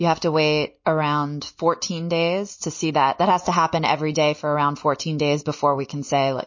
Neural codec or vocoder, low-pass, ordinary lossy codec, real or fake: none; 7.2 kHz; MP3, 32 kbps; real